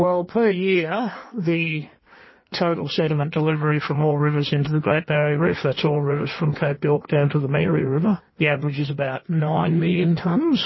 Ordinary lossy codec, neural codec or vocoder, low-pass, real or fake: MP3, 24 kbps; codec, 16 kHz in and 24 kHz out, 1.1 kbps, FireRedTTS-2 codec; 7.2 kHz; fake